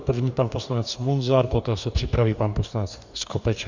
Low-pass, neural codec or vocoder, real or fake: 7.2 kHz; codec, 44.1 kHz, 2.6 kbps, DAC; fake